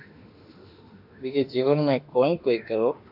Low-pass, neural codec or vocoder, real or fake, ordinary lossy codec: 5.4 kHz; autoencoder, 48 kHz, 32 numbers a frame, DAC-VAE, trained on Japanese speech; fake; AAC, 48 kbps